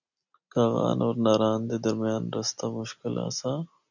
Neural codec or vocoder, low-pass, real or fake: none; 7.2 kHz; real